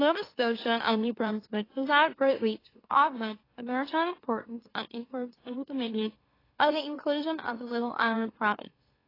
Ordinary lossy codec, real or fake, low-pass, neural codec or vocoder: AAC, 24 kbps; fake; 5.4 kHz; autoencoder, 44.1 kHz, a latent of 192 numbers a frame, MeloTTS